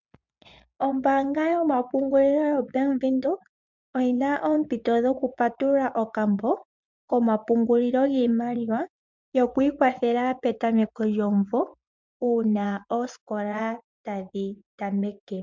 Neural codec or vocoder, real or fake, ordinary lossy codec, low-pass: vocoder, 24 kHz, 100 mel bands, Vocos; fake; MP3, 64 kbps; 7.2 kHz